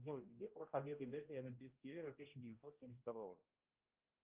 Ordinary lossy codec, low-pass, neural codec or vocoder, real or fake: Opus, 24 kbps; 3.6 kHz; codec, 16 kHz, 0.5 kbps, X-Codec, HuBERT features, trained on general audio; fake